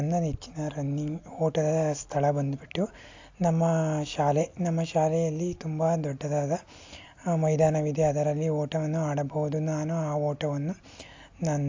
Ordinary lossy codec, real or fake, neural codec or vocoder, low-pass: AAC, 48 kbps; real; none; 7.2 kHz